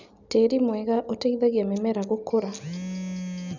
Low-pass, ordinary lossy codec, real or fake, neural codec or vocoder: 7.2 kHz; none; real; none